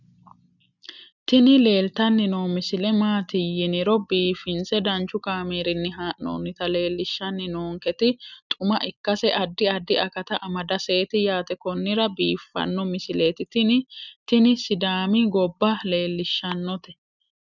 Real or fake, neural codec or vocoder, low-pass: real; none; 7.2 kHz